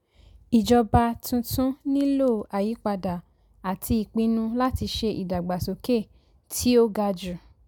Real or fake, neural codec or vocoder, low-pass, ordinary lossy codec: real; none; 19.8 kHz; none